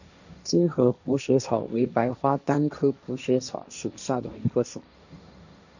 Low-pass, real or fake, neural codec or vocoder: 7.2 kHz; fake; codec, 16 kHz, 1.1 kbps, Voila-Tokenizer